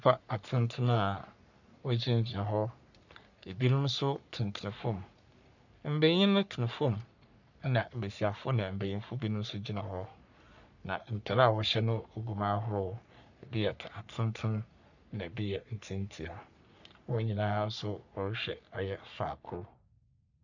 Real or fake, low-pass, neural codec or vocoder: fake; 7.2 kHz; codec, 44.1 kHz, 3.4 kbps, Pupu-Codec